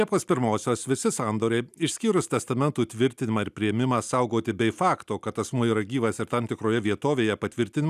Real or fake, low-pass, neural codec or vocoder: real; 14.4 kHz; none